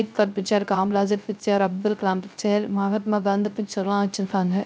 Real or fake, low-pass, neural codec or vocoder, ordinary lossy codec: fake; none; codec, 16 kHz, 0.3 kbps, FocalCodec; none